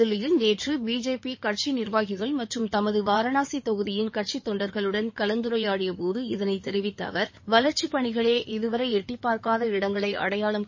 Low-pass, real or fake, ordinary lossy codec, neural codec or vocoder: 7.2 kHz; fake; MP3, 32 kbps; codec, 16 kHz in and 24 kHz out, 2.2 kbps, FireRedTTS-2 codec